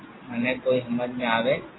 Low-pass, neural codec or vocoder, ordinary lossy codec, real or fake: 7.2 kHz; none; AAC, 16 kbps; real